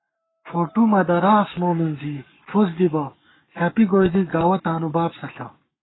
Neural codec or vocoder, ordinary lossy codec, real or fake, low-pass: codec, 44.1 kHz, 7.8 kbps, Pupu-Codec; AAC, 16 kbps; fake; 7.2 kHz